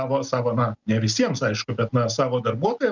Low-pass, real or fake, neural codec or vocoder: 7.2 kHz; real; none